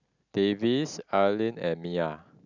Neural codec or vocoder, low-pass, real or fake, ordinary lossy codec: none; 7.2 kHz; real; none